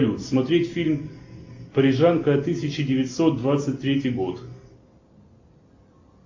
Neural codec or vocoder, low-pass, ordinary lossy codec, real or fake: none; 7.2 kHz; AAC, 48 kbps; real